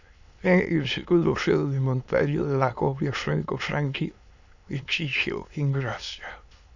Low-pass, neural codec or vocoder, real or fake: 7.2 kHz; autoencoder, 22.05 kHz, a latent of 192 numbers a frame, VITS, trained on many speakers; fake